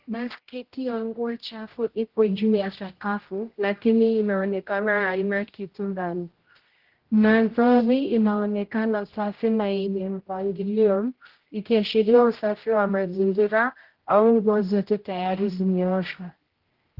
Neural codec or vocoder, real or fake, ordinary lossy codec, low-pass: codec, 16 kHz, 0.5 kbps, X-Codec, HuBERT features, trained on general audio; fake; Opus, 16 kbps; 5.4 kHz